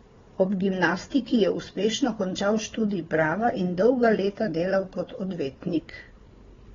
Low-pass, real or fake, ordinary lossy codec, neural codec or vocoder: 7.2 kHz; fake; AAC, 24 kbps; codec, 16 kHz, 4 kbps, FunCodec, trained on Chinese and English, 50 frames a second